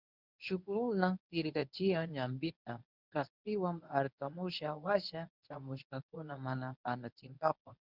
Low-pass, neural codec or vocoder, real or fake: 5.4 kHz; codec, 24 kHz, 0.9 kbps, WavTokenizer, medium speech release version 1; fake